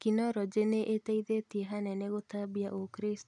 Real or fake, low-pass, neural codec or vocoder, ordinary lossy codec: real; 10.8 kHz; none; none